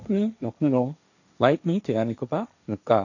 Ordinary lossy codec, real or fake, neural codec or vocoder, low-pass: none; fake; codec, 16 kHz, 1.1 kbps, Voila-Tokenizer; 7.2 kHz